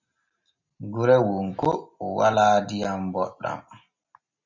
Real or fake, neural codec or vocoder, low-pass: real; none; 7.2 kHz